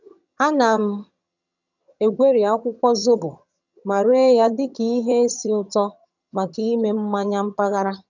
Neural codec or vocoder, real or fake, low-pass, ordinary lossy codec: vocoder, 22.05 kHz, 80 mel bands, HiFi-GAN; fake; 7.2 kHz; none